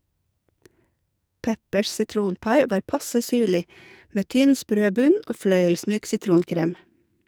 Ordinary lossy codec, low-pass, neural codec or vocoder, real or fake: none; none; codec, 44.1 kHz, 2.6 kbps, SNAC; fake